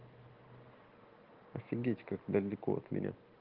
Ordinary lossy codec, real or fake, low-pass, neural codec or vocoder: Opus, 16 kbps; real; 5.4 kHz; none